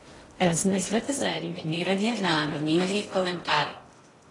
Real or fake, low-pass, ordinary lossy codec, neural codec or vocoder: fake; 10.8 kHz; AAC, 32 kbps; codec, 16 kHz in and 24 kHz out, 0.6 kbps, FocalCodec, streaming, 2048 codes